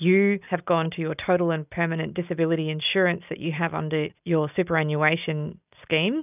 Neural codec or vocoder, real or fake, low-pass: none; real; 3.6 kHz